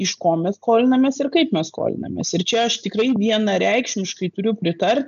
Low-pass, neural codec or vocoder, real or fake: 7.2 kHz; none; real